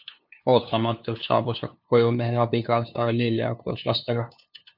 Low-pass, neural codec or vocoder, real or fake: 5.4 kHz; codec, 16 kHz, 2 kbps, FunCodec, trained on LibriTTS, 25 frames a second; fake